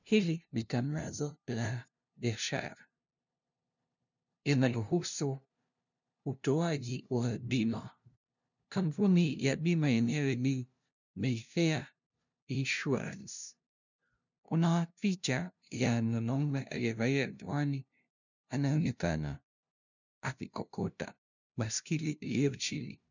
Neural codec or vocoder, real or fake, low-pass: codec, 16 kHz, 0.5 kbps, FunCodec, trained on LibriTTS, 25 frames a second; fake; 7.2 kHz